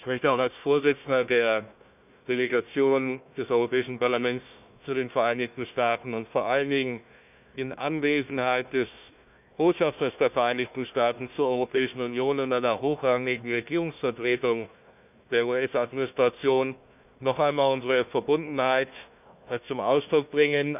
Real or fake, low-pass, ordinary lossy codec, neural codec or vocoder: fake; 3.6 kHz; none; codec, 16 kHz, 1 kbps, FunCodec, trained on Chinese and English, 50 frames a second